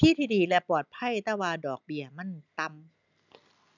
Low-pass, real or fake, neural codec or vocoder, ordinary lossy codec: 7.2 kHz; real; none; none